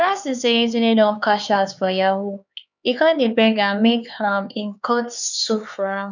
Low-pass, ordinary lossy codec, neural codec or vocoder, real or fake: 7.2 kHz; none; codec, 16 kHz, 4 kbps, X-Codec, HuBERT features, trained on LibriSpeech; fake